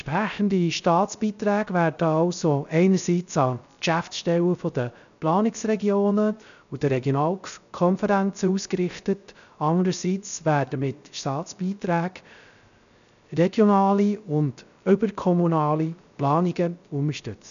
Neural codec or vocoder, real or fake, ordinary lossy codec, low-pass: codec, 16 kHz, 0.3 kbps, FocalCodec; fake; none; 7.2 kHz